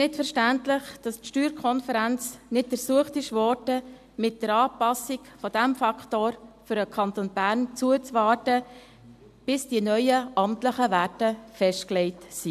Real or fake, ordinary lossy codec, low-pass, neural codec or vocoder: real; AAC, 96 kbps; 14.4 kHz; none